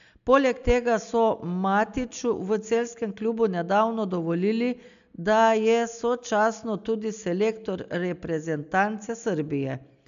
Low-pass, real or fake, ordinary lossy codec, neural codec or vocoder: 7.2 kHz; real; AAC, 96 kbps; none